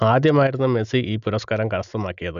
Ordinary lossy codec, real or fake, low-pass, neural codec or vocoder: none; real; 7.2 kHz; none